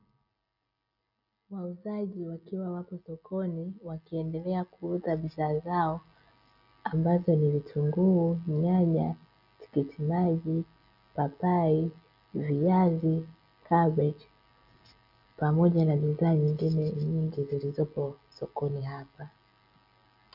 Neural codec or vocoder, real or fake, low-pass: none; real; 5.4 kHz